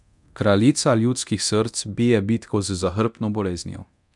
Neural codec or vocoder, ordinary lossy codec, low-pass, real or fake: codec, 24 kHz, 0.9 kbps, DualCodec; none; 10.8 kHz; fake